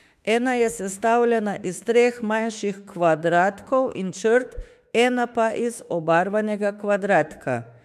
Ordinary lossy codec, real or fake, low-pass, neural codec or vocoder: AAC, 96 kbps; fake; 14.4 kHz; autoencoder, 48 kHz, 32 numbers a frame, DAC-VAE, trained on Japanese speech